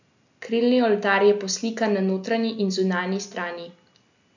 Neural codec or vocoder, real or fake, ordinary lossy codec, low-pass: none; real; none; 7.2 kHz